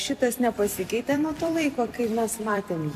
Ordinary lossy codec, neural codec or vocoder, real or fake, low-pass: AAC, 96 kbps; vocoder, 44.1 kHz, 128 mel bands every 256 samples, BigVGAN v2; fake; 14.4 kHz